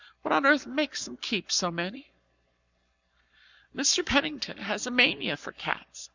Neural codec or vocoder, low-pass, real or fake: codec, 44.1 kHz, 7.8 kbps, Pupu-Codec; 7.2 kHz; fake